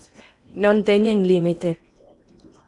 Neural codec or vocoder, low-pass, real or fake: codec, 16 kHz in and 24 kHz out, 0.8 kbps, FocalCodec, streaming, 65536 codes; 10.8 kHz; fake